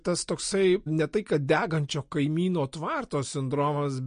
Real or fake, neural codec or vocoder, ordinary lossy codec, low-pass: real; none; MP3, 48 kbps; 9.9 kHz